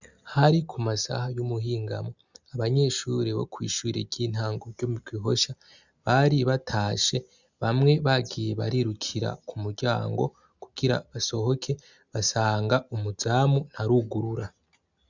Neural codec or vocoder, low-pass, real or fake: none; 7.2 kHz; real